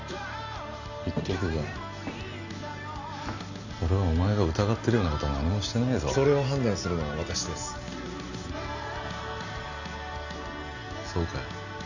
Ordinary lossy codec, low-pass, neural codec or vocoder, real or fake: none; 7.2 kHz; none; real